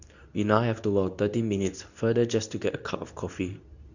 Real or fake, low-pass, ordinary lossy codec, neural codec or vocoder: fake; 7.2 kHz; none; codec, 24 kHz, 0.9 kbps, WavTokenizer, medium speech release version 2